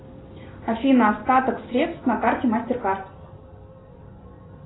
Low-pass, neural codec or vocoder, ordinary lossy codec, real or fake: 7.2 kHz; none; AAC, 16 kbps; real